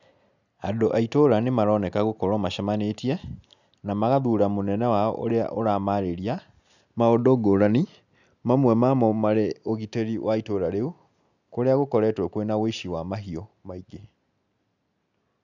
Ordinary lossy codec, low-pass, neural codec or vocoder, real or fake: none; 7.2 kHz; none; real